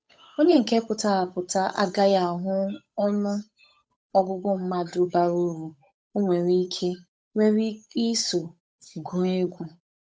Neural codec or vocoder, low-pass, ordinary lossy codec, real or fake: codec, 16 kHz, 8 kbps, FunCodec, trained on Chinese and English, 25 frames a second; none; none; fake